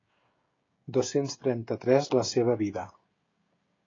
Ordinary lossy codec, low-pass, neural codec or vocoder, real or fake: AAC, 32 kbps; 7.2 kHz; codec, 16 kHz, 16 kbps, FreqCodec, smaller model; fake